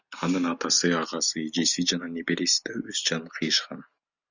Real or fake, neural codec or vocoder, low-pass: real; none; 7.2 kHz